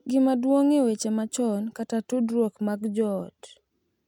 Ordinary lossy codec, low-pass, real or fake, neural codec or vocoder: none; 19.8 kHz; real; none